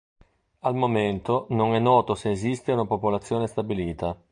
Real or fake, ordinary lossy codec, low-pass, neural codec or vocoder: real; AAC, 64 kbps; 9.9 kHz; none